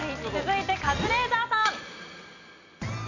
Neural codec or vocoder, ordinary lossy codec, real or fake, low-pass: none; AAC, 48 kbps; real; 7.2 kHz